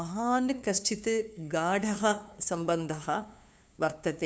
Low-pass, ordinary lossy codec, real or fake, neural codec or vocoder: none; none; fake; codec, 16 kHz, 2 kbps, FunCodec, trained on LibriTTS, 25 frames a second